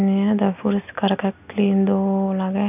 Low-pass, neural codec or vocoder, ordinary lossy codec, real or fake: 3.6 kHz; none; none; real